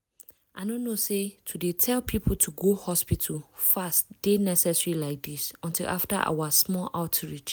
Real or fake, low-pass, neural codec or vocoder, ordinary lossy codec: real; none; none; none